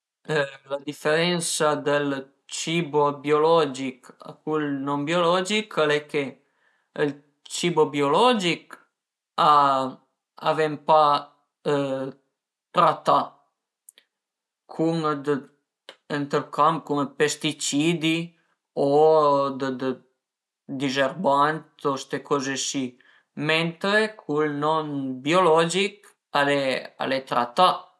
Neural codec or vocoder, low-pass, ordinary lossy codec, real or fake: none; none; none; real